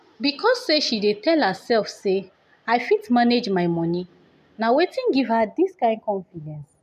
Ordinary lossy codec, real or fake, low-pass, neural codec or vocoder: none; real; 14.4 kHz; none